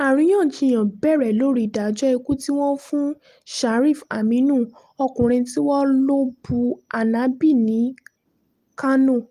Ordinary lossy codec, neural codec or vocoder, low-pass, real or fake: Opus, 32 kbps; none; 14.4 kHz; real